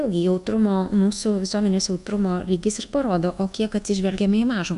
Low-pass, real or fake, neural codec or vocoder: 10.8 kHz; fake; codec, 24 kHz, 1.2 kbps, DualCodec